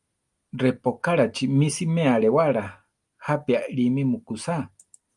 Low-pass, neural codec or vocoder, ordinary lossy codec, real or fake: 10.8 kHz; none; Opus, 32 kbps; real